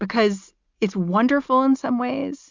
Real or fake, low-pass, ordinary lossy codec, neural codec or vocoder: real; 7.2 kHz; MP3, 64 kbps; none